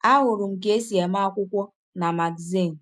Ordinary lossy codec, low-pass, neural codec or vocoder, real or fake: none; none; none; real